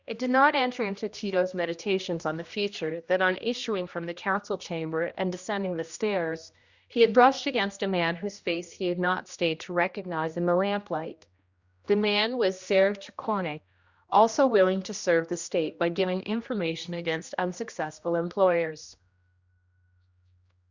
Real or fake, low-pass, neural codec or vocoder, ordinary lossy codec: fake; 7.2 kHz; codec, 16 kHz, 1 kbps, X-Codec, HuBERT features, trained on general audio; Opus, 64 kbps